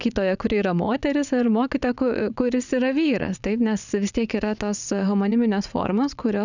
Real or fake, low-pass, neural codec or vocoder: fake; 7.2 kHz; vocoder, 22.05 kHz, 80 mel bands, WaveNeXt